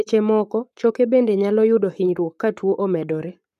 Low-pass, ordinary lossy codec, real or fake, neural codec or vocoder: 14.4 kHz; none; fake; codec, 44.1 kHz, 7.8 kbps, Pupu-Codec